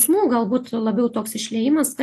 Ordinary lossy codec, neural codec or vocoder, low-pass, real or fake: AAC, 64 kbps; none; 14.4 kHz; real